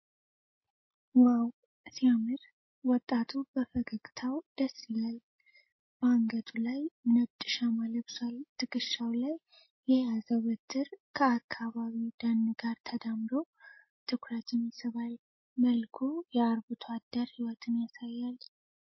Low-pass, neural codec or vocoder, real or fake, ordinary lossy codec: 7.2 kHz; none; real; MP3, 24 kbps